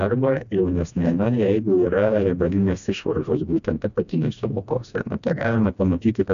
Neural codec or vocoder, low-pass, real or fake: codec, 16 kHz, 1 kbps, FreqCodec, smaller model; 7.2 kHz; fake